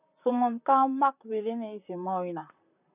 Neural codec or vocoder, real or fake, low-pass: vocoder, 44.1 kHz, 128 mel bands, Pupu-Vocoder; fake; 3.6 kHz